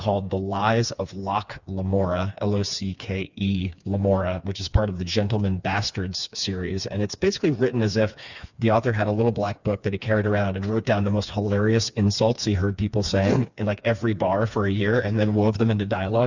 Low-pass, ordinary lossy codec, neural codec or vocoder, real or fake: 7.2 kHz; Opus, 64 kbps; codec, 16 kHz, 4 kbps, FreqCodec, smaller model; fake